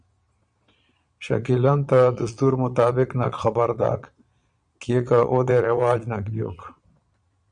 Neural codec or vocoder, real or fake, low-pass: vocoder, 22.05 kHz, 80 mel bands, Vocos; fake; 9.9 kHz